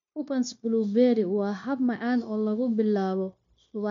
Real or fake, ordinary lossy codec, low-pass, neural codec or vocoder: fake; MP3, 64 kbps; 7.2 kHz; codec, 16 kHz, 0.9 kbps, LongCat-Audio-Codec